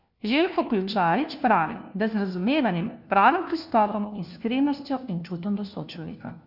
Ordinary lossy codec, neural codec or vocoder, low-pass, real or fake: none; codec, 16 kHz, 1 kbps, FunCodec, trained on LibriTTS, 50 frames a second; 5.4 kHz; fake